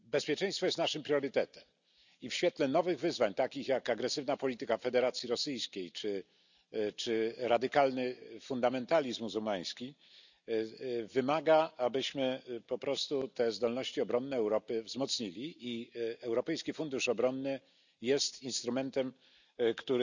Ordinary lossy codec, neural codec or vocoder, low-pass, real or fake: none; none; 7.2 kHz; real